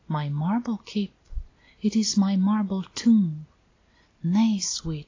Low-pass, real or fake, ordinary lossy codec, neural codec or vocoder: 7.2 kHz; real; AAC, 32 kbps; none